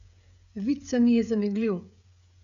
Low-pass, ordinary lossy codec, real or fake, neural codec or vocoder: 7.2 kHz; none; fake; codec, 16 kHz, 16 kbps, FreqCodec, smaller model